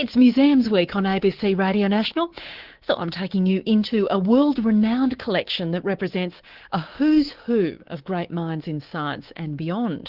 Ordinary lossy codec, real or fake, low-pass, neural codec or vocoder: Opus, 16 kbps; fake; 5.4 kHz; codec, 24 kHz, 3.1 kbps, DualCodec